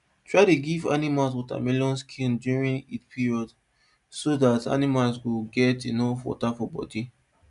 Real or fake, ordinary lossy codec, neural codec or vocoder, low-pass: real; none; none; 10.8 kHz